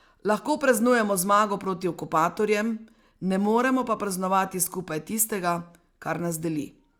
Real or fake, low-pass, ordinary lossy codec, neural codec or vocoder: real; 19.8 kHz; Opus, 64 kbps; none